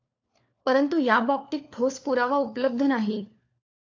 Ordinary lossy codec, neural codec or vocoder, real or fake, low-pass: AAC, 48 kbps; codec, 16 kHz, 4 kbps, FunCodec, trained on LibriTTS, 50 frames a second; fake; 7.2 kHz